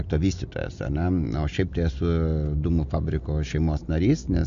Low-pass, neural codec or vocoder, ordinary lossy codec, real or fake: 7.2 kHz; none; MP3, 64 kbps; real